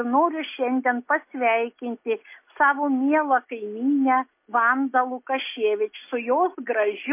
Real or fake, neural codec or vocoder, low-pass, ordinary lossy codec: real; none; 3.6 kHz; MP3, 24 kbps